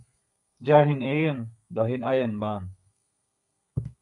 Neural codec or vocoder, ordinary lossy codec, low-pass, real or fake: codec, 32 kHz, 1.9 kbps, SNAC; MP3, 96 kbps; 10.8 kHz; fake